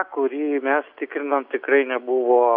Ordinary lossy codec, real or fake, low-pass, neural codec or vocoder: MP3, 48 kbps; real; 5.4 kHz; none